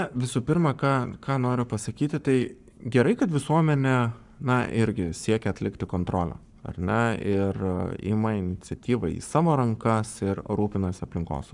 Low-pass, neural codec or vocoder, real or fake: 10.8 kHz; codec, 44.1 kHz, 7.8 kbps, Pupu-Codec; fake